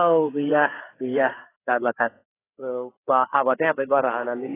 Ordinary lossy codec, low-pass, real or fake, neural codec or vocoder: AAC, 16 kbps; 3.6 kHz; fake; codec, 16 kHz, 4 kbps, FreqCodec, larger model